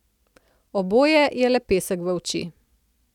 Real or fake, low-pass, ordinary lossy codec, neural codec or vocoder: real; 19.8 kHz; none; none